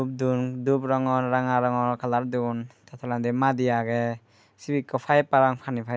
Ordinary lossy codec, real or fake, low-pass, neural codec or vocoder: none; real; none; none